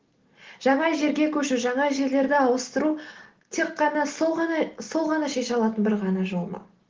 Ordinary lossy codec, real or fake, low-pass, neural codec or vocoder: Opus, 16 kbps; real; 7.2 kHz; none